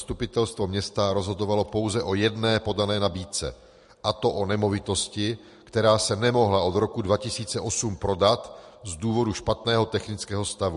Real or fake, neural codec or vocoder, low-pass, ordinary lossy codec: real; none; 14.4 kHz; MP3, 48 kbps